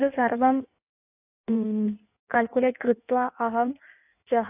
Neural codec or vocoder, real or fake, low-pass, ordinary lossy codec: codec, 16 kHz in and 24 kHz out, 1.1 kbps, FireRedTTS-2 codec; fake; 3.6 kHz; AAC, 32 kbps